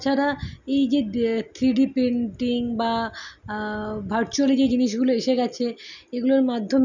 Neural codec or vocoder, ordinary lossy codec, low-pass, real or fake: none; none; 7.2 kHz; real